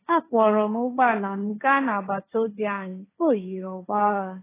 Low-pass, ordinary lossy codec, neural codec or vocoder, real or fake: 3.6 kHz; AAC, 16 kbps; codec, 16 kHz, 1.1 kbps, Voila-Tokenizer; fake